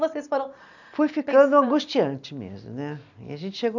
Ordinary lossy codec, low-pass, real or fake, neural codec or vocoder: none; 7.2 kHz; real; none